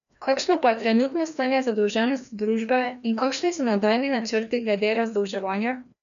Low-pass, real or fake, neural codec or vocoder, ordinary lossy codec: 7.2 kHz; fake; codec, 16 kHz, 1 kbps, FreqCodec, larger model; none